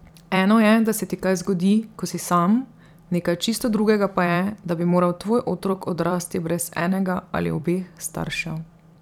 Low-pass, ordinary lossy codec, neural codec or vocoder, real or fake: 19.8 kHz; none; vocoder, 44.1 kHz, 128 mel bands every 256 samples, BigVGAN v2; fake